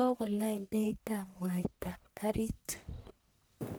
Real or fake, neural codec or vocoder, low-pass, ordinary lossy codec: fake; codec, 44.1 kHz, 1.7 kbps, Pupu-Codec; none; none